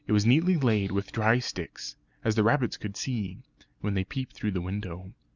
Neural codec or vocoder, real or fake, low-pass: none; real; 7.2 kHz